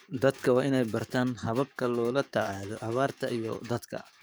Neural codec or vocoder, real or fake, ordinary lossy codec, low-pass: codec, 44.1 kHz, 7.8 kbps, DAC; fake; none; none